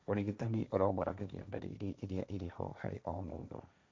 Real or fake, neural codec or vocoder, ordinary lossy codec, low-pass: fake; codec, 16 kHz, 1.1 kbps, Voila-Tokenizer; none; none